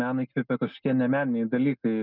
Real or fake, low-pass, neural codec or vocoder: real; 5.4 kHz; none